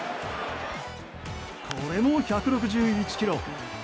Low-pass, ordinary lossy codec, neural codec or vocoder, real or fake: none; none; none; real